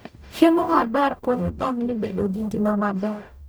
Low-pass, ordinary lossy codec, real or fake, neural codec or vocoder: none; none; fake; codec, 44.1 kHz, 0.9 kbps, DAC